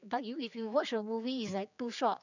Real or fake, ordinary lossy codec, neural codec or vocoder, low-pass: fake; none; codec, 16 kHz, 4 kbps, X-Codec, HuBERT features, trained on general audio; 7.2 kHz